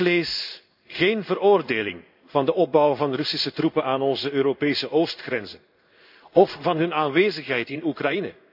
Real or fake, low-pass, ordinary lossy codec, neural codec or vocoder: fake; 5.4 kHz; none; codec, 16 kHz in and 24 kHz out, 1 kbps, XY-Tokenizer